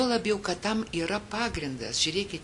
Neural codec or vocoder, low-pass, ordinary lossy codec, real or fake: none; 10.8 kHz; MP3, 64 kbps; real